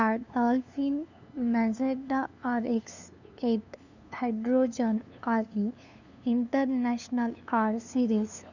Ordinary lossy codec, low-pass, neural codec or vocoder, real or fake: none; 7.2 kHz; codec, 16 kHz, 2 kbps, FunCodec, trained on LibriTTS, 25 frames a second; fake